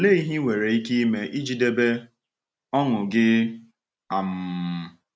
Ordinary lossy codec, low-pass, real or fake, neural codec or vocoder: none; none; real; none